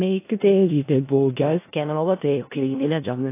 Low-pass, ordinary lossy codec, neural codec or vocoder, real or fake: 3.6 kHz; AAC, 24 kbps; codec, 16 kHz in and 24 kHz out, 0.4 kbps, LongCat-Audio-Codec, four codebook decoder; fake